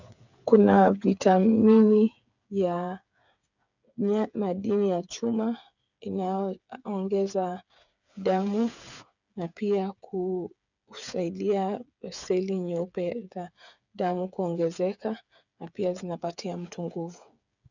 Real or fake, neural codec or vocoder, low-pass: fake; codec, 16 kHz, 8 kbps, FreqCodec, smaller model; 7.2 kHz